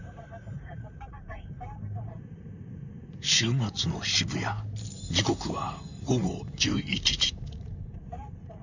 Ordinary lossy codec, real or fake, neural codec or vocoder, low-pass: none; fake; vocoder, 44.1 kHz, 128 mel bands, Pupu-Vocoder; 7.2 kHz